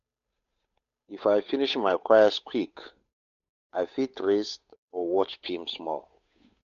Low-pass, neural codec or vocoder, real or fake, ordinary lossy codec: 7.2 kHz; codec, 16 kHz, 8 kbps, FunCodec, trained on Chinese and English, 25 frames a second; fake; MP3, 48 kbps